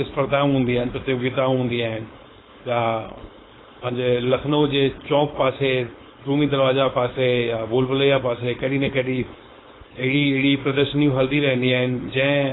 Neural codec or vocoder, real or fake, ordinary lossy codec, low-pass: codec, 16 kHz, 4.8 kbps, FACodec; fake; AAC, 16 kbps; 7.2 kHz